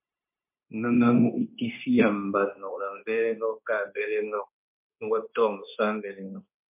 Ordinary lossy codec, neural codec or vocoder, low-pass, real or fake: MP3, 32 kbps; codec, 16 kHz, 0.9 kbps, LongCat-Audio-Codec; 3.6 kHz; fake